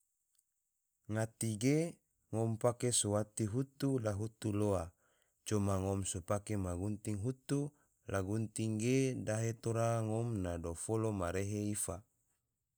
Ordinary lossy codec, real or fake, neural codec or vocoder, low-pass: none; real; none; none